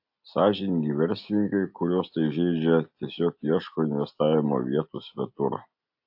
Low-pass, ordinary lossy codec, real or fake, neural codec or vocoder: 5.4 kHz; AAC, 48 kbps; real; none